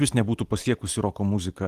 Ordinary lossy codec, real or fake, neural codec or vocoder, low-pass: Opus, 24 kbps; real; none; 14.4 kHz